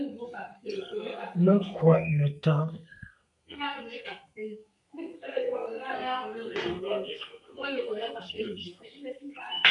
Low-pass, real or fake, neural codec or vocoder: 10.8 kHz; fake; codec, 32 kHz, 1.9 kbps, SNAC